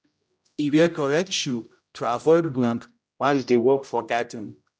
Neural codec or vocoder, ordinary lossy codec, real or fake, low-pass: codec, 16 kHz, 0.5 kbps, X-Codec, HuBERT features, trained on general audio; none; fake; none